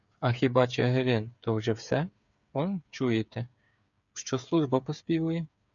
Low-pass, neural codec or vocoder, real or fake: 7.2 kHz; codec, 16 kHz, 8 kbps, FreqCodec, smaller model; fake